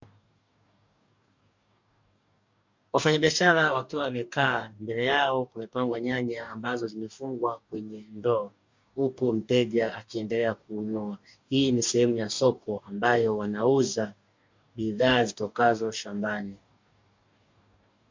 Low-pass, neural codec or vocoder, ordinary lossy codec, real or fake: 7.2 kHz; codec, 44.1 kHz, 2.6 kbps, DAC; MP3, 48 kbps; fake